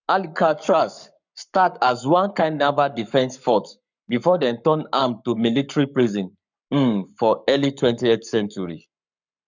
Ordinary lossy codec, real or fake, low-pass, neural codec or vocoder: none; fake; 7.2 kHz; codec, 44.1 kHz, 7.8 kbps, Pupu-Codec